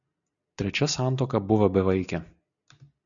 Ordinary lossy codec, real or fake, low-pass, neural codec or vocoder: MP3, 96 kbps; real; 7.2 kHz; none